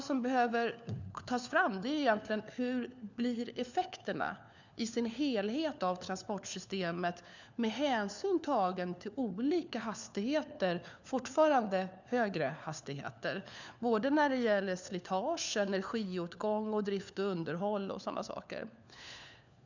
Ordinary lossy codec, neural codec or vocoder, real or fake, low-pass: none; codec, 16 kHz, 4 kbps, FunCodec, trained on LibriTTS, 50 frames a second; fake; 7.2 kHz